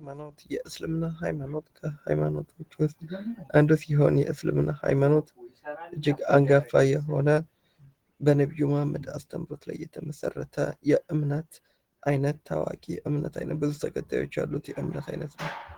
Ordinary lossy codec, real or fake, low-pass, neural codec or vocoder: Opus, 16 kbps; real; 19.8 kHz; none